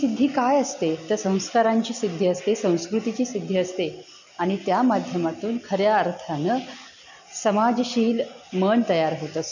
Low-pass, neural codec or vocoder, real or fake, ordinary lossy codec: 7.2 kHz; none; real; none